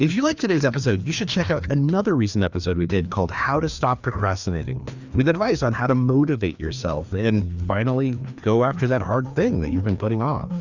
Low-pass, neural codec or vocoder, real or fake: 7.2 kHz; codec, 16 kHz, 2 kbps, FreqCodec, larger model; fake